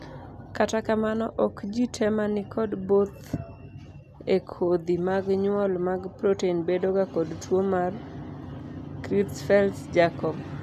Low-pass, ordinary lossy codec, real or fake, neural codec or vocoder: 14.4 kHz; none; real; none